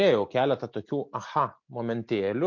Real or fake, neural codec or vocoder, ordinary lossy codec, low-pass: real; none; MP3, 48 kbps; 7.2 kHz